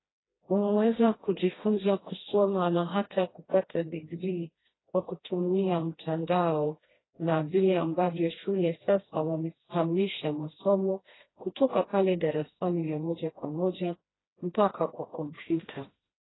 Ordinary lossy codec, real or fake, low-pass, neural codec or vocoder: AAC, 16 kbps; fake; 7.2 kHz; codec, 16 kHz, 1 kbps, FreqCodec, smaller model